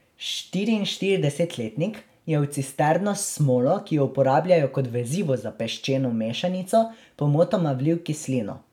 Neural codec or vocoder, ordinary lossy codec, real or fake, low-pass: none; none; real; 19.8 kHz